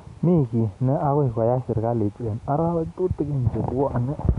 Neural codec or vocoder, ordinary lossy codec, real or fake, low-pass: none; none; real; 10.8 kHz